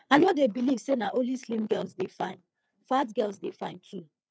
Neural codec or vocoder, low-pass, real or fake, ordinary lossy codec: codec, 16 kHz, 4 kbps, FreqCodec, larger model; none; fake; none